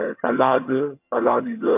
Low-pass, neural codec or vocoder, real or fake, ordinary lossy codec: 3.6 kHz; vocoder, 22.05 kHz, 80 mel bands, HiFi-GAN; fake; MP3, 32 kbps